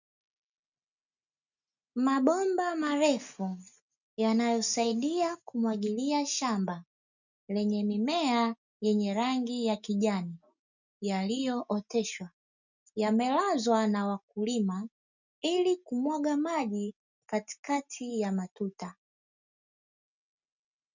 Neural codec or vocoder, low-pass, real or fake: none; 7.2 kHz; real